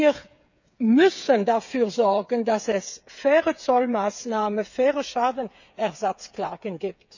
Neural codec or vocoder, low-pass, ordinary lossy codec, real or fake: codec, 16 kHz, 8 kbps, FreqCodec, smaller model; 7.2 kHz; none; fake